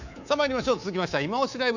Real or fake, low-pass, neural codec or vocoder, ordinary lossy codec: fake; 7.2 kHz; codec, 24 kHz, 3.1 kbps, DualCodec; none